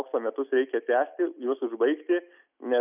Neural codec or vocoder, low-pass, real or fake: none; 3.6 kHz; real